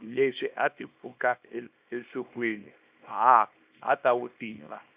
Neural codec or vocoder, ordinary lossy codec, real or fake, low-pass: codec, 24 kHz, 0.9 kbps, WavTokenizer, small release; Opus, 64 kbps; fake; 3.6 kHz